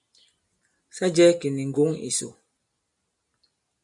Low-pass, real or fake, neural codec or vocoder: 10.8 kHz; real; none